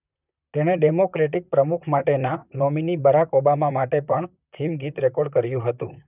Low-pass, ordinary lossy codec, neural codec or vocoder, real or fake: 3.6 kHz; none; vocoder, 44.1 kHz, 128 mel bands, Pupu-Vocoder; fake